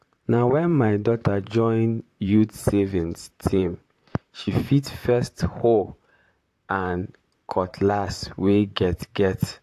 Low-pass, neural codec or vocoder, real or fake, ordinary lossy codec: 14.4 kHz; vocoder, 44.1 kHz, 128 mel bands, Pupu-Vocoder; fake; AAC, 64 kbps